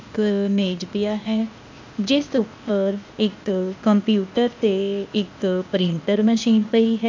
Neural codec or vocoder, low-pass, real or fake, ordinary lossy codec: codec, 16 kHz, 0.8 kbps, ZipCodec; 7.2 kHz; fake; MP3, 64 kbps